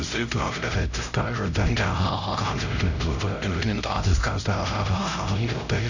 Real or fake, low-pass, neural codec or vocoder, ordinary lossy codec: fake; 7.2 kHz; codec, 16 kHz, 0.5 kbps, X-Codec, HuBERT features, trained on LibriSpeech; none